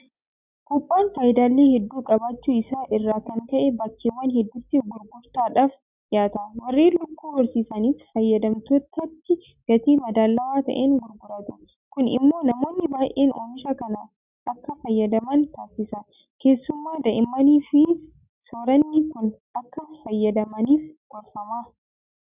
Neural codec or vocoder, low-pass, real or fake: none; 3.6 kHz; real